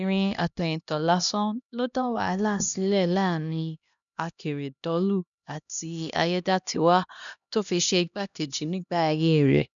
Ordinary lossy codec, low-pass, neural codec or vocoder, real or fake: none; 7.2 kHz; codec, 16 kHz, 1 kbps, X-Codec, HuBERT features, trained on LibriSpeech; fake